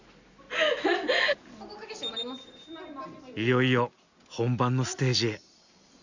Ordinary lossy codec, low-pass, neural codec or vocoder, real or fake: Opus, 64 kbps; 7.2 kHz; none; real